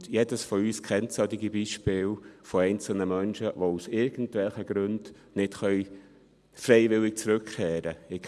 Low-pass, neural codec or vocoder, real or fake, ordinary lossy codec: none; none; real; none